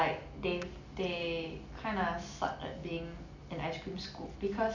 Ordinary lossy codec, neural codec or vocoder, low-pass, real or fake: none; none; 7.2 kHz; real